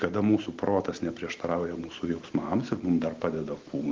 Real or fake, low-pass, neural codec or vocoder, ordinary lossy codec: real; 7.2 kHz; none; Opus, 16 kbps